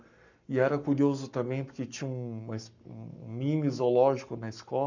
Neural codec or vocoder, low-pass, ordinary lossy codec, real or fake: codec, 44.1 kHz, 7.8 kbps, Pupu-Codec; 7.2 kHz; none; fake